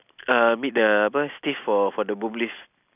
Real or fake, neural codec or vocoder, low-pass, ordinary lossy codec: real; none; 3.6 kHz; none